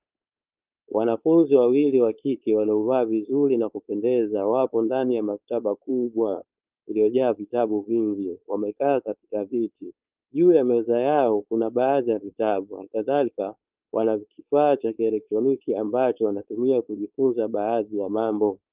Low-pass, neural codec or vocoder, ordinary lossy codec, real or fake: 3.6 kHz; codec, 16 kHz, 4.8 kbps, FACodec; Opus, 24 kbps; fake